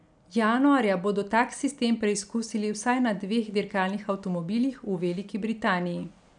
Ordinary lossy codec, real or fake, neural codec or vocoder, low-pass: none; real; none; 9.9 kHz